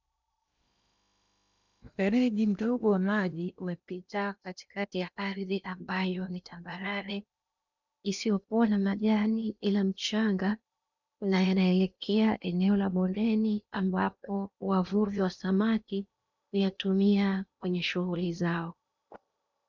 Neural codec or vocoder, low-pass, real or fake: codec, 16 kHz in and 24 kHz out, 0.8 kbps, FocalCodec, streaming, 65536 codes; 7.2 kHz; fake